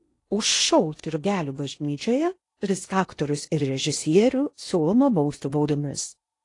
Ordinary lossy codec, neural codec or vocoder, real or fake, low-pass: AAC, 48 kbps; codec, 16 kHz in and 24 kHz out, 0.8 kbps, FocalCodec, streaming, 65536 codes; fake; 10.8 kHz